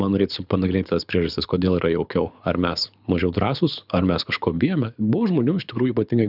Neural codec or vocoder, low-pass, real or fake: codec, 24 kHz, 6 kbps, HILCodec; 5.4 kHz; fake